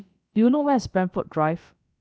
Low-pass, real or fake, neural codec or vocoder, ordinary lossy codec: none; fake; codec, 16 kHz, about 1 kbps, DyCAST, with the encoder's durations; none